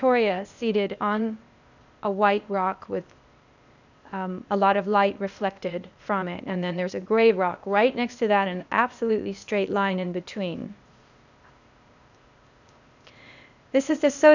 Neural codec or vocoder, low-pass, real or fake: codec, 16 kHz, 0.8 kbps, ZipCodec; 7.2 kHz; fake